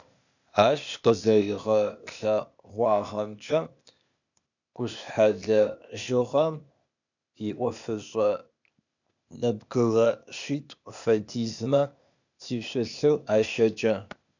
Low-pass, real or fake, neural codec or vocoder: 7.2 kHz; fake; codec, 16 kHz, 0.8 kbps, ZipCodec